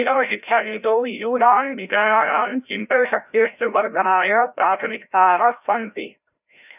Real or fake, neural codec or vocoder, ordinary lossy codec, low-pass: fake; codec, 16 kHz, 0.5 kbps, FreqCodec, larger model; none; 3.6 kHz